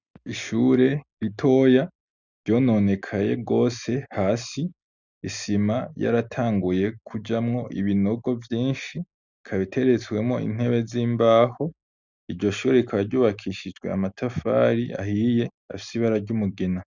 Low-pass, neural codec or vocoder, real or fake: 7.2 kHz; none; real